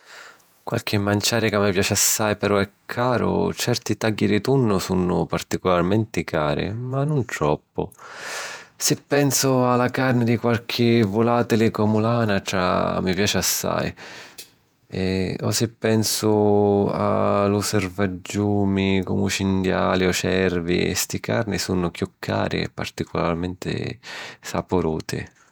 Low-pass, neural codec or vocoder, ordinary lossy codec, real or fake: none; vocoder, 48 kHz, 128 mel bands, Vocos; none; fake